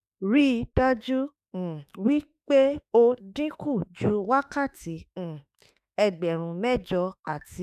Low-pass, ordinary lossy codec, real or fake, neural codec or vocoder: 14.4 kHz; none; fake; autoencoder, 48 kHz, 32 numbers a frame, DAC-VAE, trained on Japanese speech